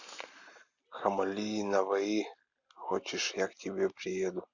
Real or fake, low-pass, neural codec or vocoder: real; 7.2 kHz; none